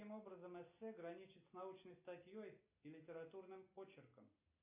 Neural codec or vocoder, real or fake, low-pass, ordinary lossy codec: none; real; 3.6 kHz; AAC, 24 kbps